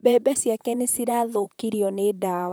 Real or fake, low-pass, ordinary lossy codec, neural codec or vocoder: fake; none; none; vocoder, 44.1 kHz, 128 mel bands, Pupu-Vocoder